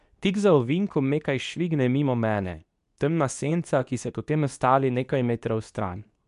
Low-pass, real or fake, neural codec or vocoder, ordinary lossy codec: 10.8 kHz; fake; codec, 24 kHz, 0.9 kbps, WavTokenizer, medium speech release version 2; none